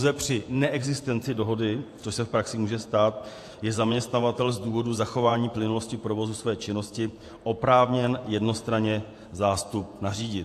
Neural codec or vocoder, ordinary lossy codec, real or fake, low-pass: vocoder, 48 kHz, 128 mel bands, Vocos; AAC, 64 kbps; fake; 14.4 kHz